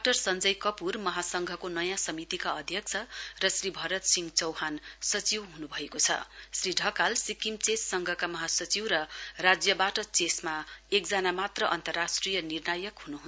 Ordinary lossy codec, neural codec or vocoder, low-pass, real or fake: none; none; none; real